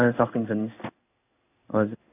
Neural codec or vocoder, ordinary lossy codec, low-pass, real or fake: codec, 16 kHz in and 24 kHz out, 1 kbps, XY-Tokenizer; none; 3.6 kHz; fake